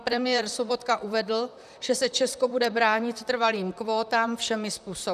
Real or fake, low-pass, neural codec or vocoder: fake; 14.4 kHz; vocoder, 44.1 kHz, 128 mel bands, Pupu-Vocoder